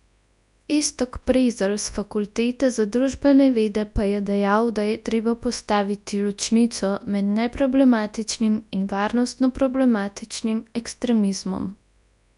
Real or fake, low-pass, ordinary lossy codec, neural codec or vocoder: fake; 10.8 kHz; none; codec, 24 kHz, 0.9 kbps, WavTokenizer, large speech release